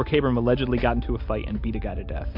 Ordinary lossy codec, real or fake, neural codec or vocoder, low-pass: MP3, 48 kbps; real; none; 5.4 kHz